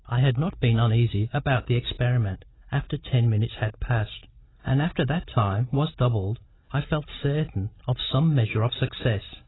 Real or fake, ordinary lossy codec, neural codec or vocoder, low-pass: real; AAC, 16 kbps; none; 7.2 kHz